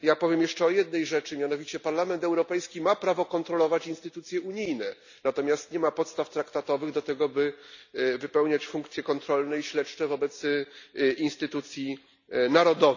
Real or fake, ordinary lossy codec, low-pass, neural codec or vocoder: real; none; 7.2 kHz; none